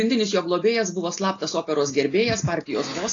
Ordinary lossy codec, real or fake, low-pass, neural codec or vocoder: AAC, 32 kbps; real; 7.2 kHz; none